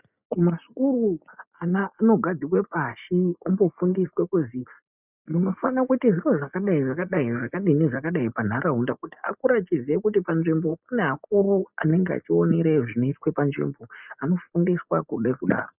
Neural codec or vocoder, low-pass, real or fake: none; 3.6 kHz; real